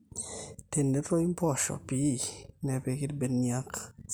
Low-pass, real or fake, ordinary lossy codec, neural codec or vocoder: none; real; none; none